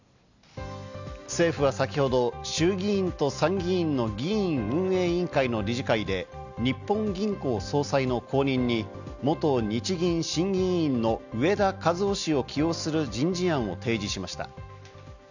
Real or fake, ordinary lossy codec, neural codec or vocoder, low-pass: real; none; none; 7.2 kHz